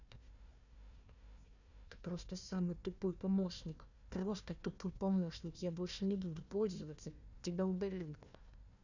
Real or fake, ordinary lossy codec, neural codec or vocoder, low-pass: fake; none; codec, 16 kHz, 1 kbps, FunCodec, trained on Chinese and English, 50 frames a second; 7.2 kHz